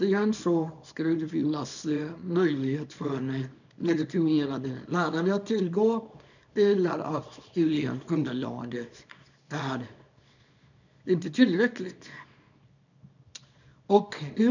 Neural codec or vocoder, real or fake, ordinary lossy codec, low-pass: codec, 24 kHz, 0.9 kbps, WavTokenizer, small release; fake; none; 7.2 kHz